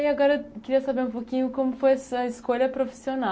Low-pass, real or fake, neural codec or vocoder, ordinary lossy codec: none; real; none; none